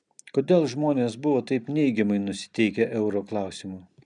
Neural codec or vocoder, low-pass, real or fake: none; 9.9 kHz; real